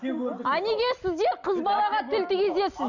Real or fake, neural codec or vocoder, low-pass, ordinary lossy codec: real; none; 7.2 kHz; none